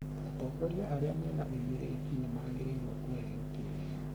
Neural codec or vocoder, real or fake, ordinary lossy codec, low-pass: codec, 44.1 kHz, 3.4 kbps, Pupu-Codec; fake; none; none